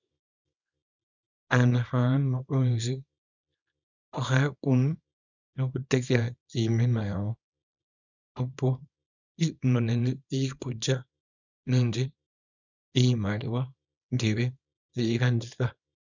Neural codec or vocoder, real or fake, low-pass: codec, 24 kHz, 0.9 kbps, WavTokenizer, small release; fake; 7.2 kHz